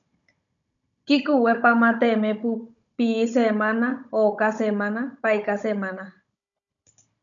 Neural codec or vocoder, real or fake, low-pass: codec, 16 kHz, 16 kbps, FunCodec, trained on Chinese and English, 50 frames a second; fake; 7.2 kHz